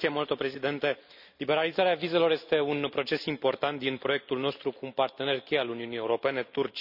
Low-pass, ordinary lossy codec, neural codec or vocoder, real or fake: 5.4 kHz; none; none; real